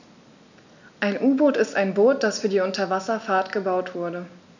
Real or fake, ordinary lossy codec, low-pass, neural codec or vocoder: real; none; 7.2 kHz; none